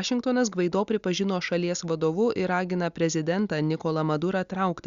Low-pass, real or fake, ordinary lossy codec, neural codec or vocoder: 7.2 kHz; real; Opus, 64 kbps; none